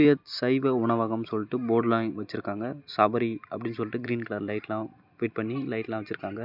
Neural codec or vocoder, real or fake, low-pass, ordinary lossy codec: none; real; 5.4 kHz; none